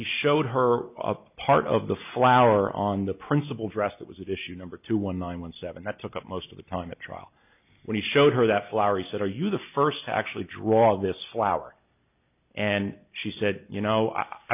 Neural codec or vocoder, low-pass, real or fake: none; 3.6 kHz; real